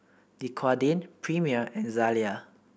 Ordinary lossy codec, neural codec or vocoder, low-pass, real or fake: none; none; none; real